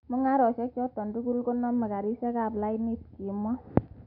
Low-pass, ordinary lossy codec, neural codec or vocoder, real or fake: 5.4 kHz; none; none; real